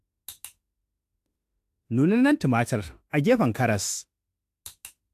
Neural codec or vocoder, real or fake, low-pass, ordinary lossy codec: autoencoder, 48 kHz, 32 numbers a frame, DAC-VAE, trained on Japanese speech; fake; 14.4 kHz; AAC, 64 kbps